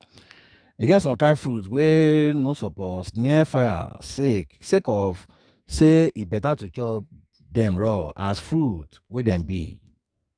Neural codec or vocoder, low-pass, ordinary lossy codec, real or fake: codec, 44.1 kHz, 2.6 kbps, SNAC; 9.9 kHz; none; fake